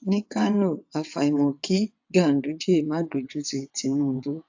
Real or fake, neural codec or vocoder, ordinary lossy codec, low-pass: fake; vocoder, 22.05 kHz, 80 mel bands, WaveNeXt; AAC, 48 kbps; 7.2 kHz